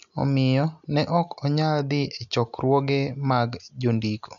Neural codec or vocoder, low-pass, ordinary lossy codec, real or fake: none; 7.2 kHz; none; real